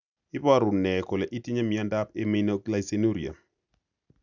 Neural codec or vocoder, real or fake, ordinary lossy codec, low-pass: none; real; none; 7.2 kHz